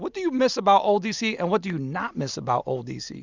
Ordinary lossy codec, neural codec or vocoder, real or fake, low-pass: Opus, 64 kbps; none; real; 7.2 kHz